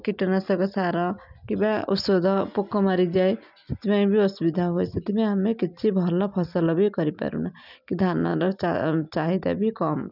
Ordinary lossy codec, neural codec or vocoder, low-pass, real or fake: none; none; 5.4 kHz; real